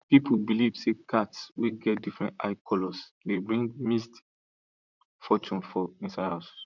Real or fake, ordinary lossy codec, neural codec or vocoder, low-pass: fake; none; vocoder, 44.1 kHz, 128 mel bands, Pupu-Vocoder; 7.2 kHz